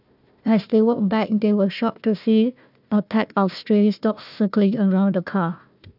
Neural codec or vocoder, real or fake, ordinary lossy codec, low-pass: codec, 16 kHz, 1 kbps, FunCodec, trained on Chinese and English, 50 frames a second; fake; none; 5.4 kHz